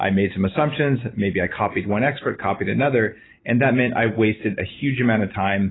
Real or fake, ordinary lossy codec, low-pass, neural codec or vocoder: real; AAC, 16 kbps; 7.2 kHz; none